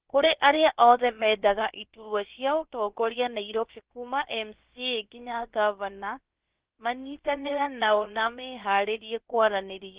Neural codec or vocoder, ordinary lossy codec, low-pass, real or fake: codec, 16 kHz, about 1 kbps, DyCAST, with the encoder's durations; Opus, 16 kbps; 3.6 kHz; fake